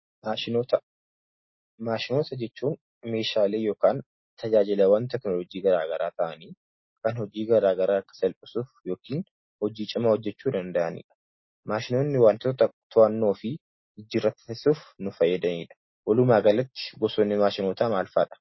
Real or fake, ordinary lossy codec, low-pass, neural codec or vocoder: real; MP3, 24 kbps; 7.2 kHz; none